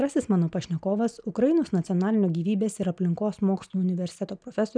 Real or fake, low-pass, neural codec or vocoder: real; 9.9 kHz; none